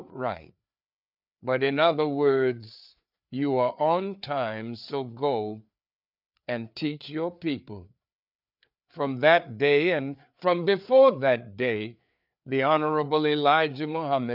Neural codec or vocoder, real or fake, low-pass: codec, 16 kHz, 4 kbps, FreqCodec, larger model; fake; 5.4 kHz